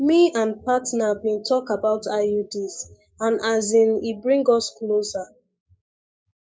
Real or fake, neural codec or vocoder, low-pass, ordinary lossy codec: fake; codec, 16 kHz, 6 kbps, DAC; none; none